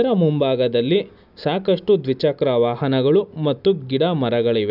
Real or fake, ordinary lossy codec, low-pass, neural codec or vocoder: real; none; 5.4 kHz; none